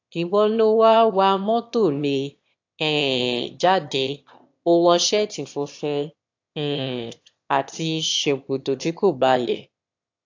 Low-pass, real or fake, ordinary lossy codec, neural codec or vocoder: 7.2 kHz; fake; AAC, 48 kbps; autoencoder, 22.05 kHz, a latent of 192 numbers a frame, VITS, trained on one speaker